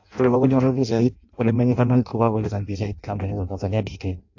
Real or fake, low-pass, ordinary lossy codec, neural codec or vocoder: fake; 7.2 kHz; AAC, 48 kbps; codec, 16 kHz in and 24 kHz out, 0.6 kbps, FireRedTTS-2 codec